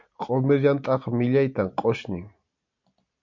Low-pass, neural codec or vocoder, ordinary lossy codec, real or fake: 7.2 kHz; none; MP3, 48 kbps; real